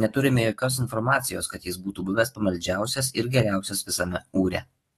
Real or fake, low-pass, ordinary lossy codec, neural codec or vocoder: fake; 19.8 kHz; AAC, 32 kbps; autoencoder, 48 kHz, 128 numbers a frame, DAC-VAE, trained on Japanese speech